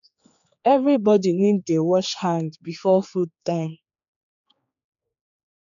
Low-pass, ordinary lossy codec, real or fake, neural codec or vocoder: 7.2 kHz; none; fake; codec, 16 kHz, 4 kbps, X-Codec, HuBERT features, trained on general audio